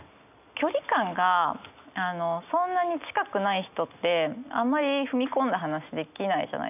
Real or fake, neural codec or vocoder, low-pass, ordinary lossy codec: real; none; 3.6 kHz; none